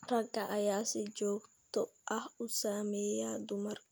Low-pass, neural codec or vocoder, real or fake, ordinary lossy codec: none; none; real; none